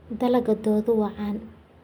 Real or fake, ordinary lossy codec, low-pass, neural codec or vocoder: real; none; 19.8 kHz; none